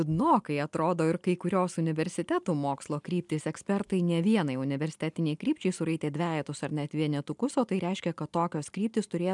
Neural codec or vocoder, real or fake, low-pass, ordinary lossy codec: none; real; 10.8 kHz; MP3, 96 kbps